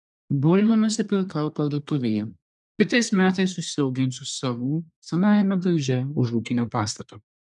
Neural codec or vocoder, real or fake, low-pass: codec, 24 kHz, 1 kbps, SNAC; fake; 10.8 kHz